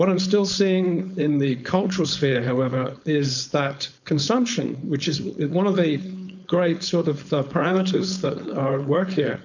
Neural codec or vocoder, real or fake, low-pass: codec, 16 kHz, 4.8 kbps, FACodec; fake; 7.2 kHz